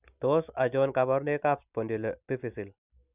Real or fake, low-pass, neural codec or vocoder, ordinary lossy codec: real; 3.6 kHz; none; none